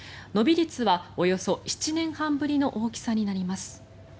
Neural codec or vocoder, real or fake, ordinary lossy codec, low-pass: none; real; none; none